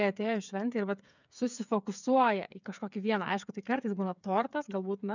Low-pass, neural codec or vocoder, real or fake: 7.2 kHz; codec, 16 kHz, 8 kbps, FreqCodec, smaller model; fake